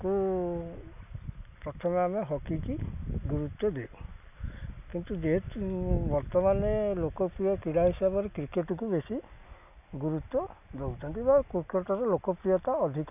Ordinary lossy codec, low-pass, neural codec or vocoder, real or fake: none; 3.6 kHz; none; real